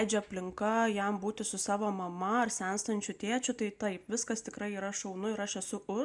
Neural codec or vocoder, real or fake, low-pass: none; real; 10.8 kHz